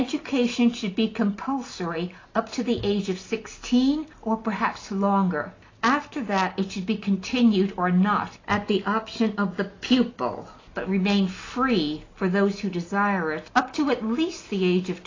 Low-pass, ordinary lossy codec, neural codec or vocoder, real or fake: 7.2 kHz; AAC, 32 kbps; none; real